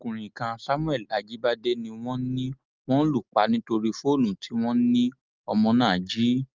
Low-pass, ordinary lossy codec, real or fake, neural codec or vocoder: 7.2 kHz; Opus, 32 kbps; real; none